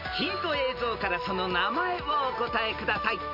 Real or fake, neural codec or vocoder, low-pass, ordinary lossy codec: real; none; 5.4 kHz; MP3, 48 kbps